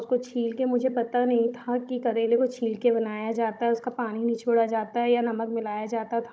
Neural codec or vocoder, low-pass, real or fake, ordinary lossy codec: codec, 16 kHz, 16 kbps, FunCodec, trained on Chinese and English, 50 frames a second; none; fake; none